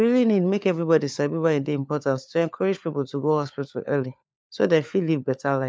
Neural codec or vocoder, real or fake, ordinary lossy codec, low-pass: codec, 16 kHz, 4 kbps, FunCodec, trained on LibriTTS, 50 frames a second; fake; none; none